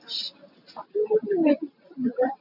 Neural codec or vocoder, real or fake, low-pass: none; real; 5.4 kHz